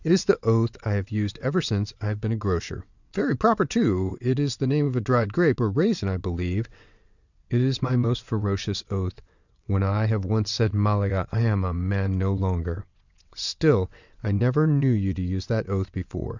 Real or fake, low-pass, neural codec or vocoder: fake; 7.2 kHz; vocoder, 22.05 kHz, 80 mel bands, WaveNeXt